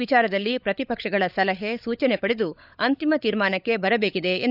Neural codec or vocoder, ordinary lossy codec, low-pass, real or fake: codec, 16 kHz, 16 kbps, FunCodec, trained on LibriTTS, 50 frames a second; none; 5.4 kHz; fake